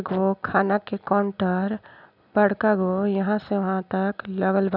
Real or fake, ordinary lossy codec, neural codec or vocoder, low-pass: real; none; none; 5.4 kHz